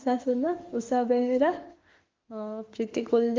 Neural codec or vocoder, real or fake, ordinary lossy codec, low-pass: autoencoder, 48 kHz, 32 numbers a frame, DAC-VAE, trained on Japanese speech; fake; Opus, 32 kbps; 7.2 kHz